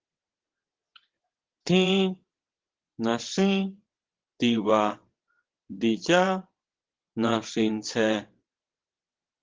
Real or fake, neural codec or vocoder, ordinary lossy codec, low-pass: fake; vocoder, 22.05 kHz, 80 mel bands, WaveNeXt; Opus, 16 kbps; 7.2 kHz